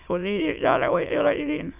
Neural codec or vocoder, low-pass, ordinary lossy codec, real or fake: autoencoder, 22.05 kHz, a latent of 192 numbers a frame, VITS, trained on many speakers; 3.6 kHz; none; fake